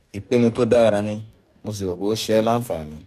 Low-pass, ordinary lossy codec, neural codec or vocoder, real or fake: 14.4 kHz; none; codec, 44.1 kHz, 2.6 kbps, DAC; fake